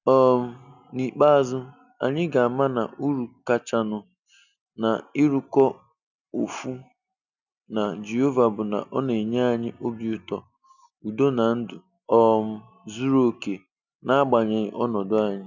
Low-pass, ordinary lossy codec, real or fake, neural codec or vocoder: 7.2 kHz; none; real; none